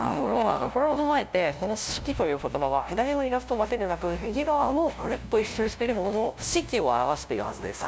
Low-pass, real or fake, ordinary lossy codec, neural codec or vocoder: none; fake; none; codec, 16 kHz, 0.5 kbps, FunCodec, trained on LibriTTS, 25 frames a second